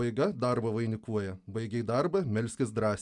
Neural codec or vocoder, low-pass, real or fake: none; 10.8 kHz; real